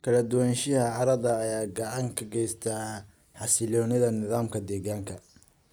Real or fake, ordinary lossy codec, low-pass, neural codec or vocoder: real; none; none; none